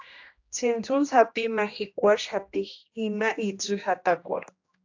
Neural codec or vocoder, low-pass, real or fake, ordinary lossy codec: codec, 16 kHz, 1 kbps, X-Codec, HuBERT features, trained on general audio; 7.2 kHz; fake; AAC, 48 kbps